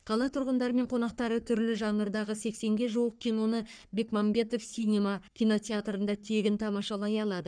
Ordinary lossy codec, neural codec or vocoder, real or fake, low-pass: none; codec, 44.1 kHz, 3.4 kbps, Pupu-Codec; fake; 9.9 kHz